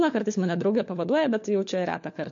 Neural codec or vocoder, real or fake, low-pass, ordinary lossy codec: codec, 16 kHz, 4 kbps, FunCodec, trained on LibriTTS, 50 frames a second; fake; 7.2 kHz; MP3, 48 kbps